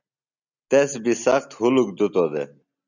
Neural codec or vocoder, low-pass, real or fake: none; 7.2 kHz; real